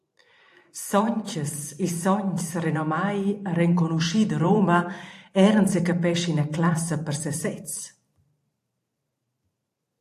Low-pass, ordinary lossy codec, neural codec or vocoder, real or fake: 14.4 kHz; AAC, 64 kbps; vocoder, 44.1 kHz, 128 mel bands every 512 samples, BigVGAN v2; fake